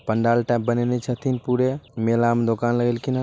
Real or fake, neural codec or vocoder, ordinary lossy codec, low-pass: real; none; none; none